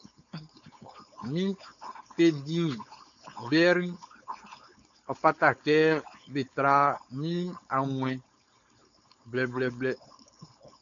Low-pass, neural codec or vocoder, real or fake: 7.2 kHz; codec, 16 kHz, 4.8 kbps, FACodec; fake